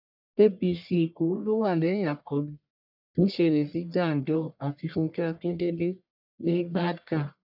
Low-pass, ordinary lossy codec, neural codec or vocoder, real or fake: 5.4 kHz; none; codec, 44.1 kHz, 1.7 kbps, Pupu-Codec; fake